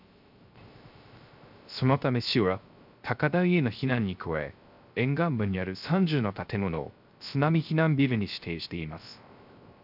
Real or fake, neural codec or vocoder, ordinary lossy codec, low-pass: fake; codec, 16 kHz, 0.3 kbps, FocalCodec; none; 5.4 kHz